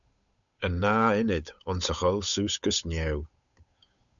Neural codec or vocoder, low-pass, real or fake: codec, 16 kHz, 8 kbps, FunCodec, trained on Chinese and English, 25 frames a second; 7.2 kHz; fake